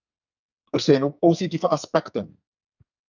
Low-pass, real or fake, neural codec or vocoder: 7.2 kHz; fake; codec, 44.1 kHz, 2.6 kbps, SNAC